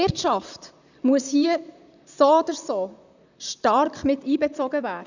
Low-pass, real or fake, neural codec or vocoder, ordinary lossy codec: 7.2 kHz; fake; vocoder, 44.1 kHz, 128 mel bands, Pupu-Vocoder; none